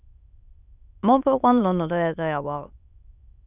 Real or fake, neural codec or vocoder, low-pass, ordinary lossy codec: fake; autoencoder, 22.05 kHz, a latent of 192 numbers a frame, VITS, trained on many speakers; 3.6 kHz; none